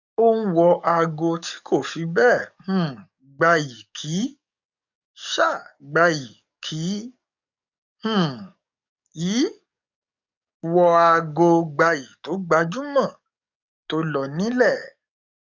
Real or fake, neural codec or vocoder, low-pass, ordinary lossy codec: fake; codec, 44.1 kHz, 7.8 kbps, DAC; 7.2 kHz; none